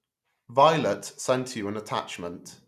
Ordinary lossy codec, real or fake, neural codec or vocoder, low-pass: none; real; none; 14.4 kHz